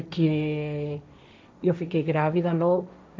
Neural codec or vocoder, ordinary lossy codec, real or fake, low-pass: codec, 16 kHz, 1.1 kbps, Voila-Tokenizer; none; fake; none